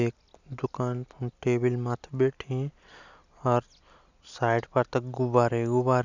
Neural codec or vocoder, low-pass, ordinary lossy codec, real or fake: none; 7.2 kHz; none; real